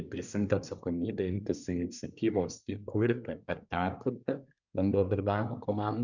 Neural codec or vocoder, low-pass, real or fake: codec, 24 kHz, 1 kbps, SNAC; 7.2 kHz; fake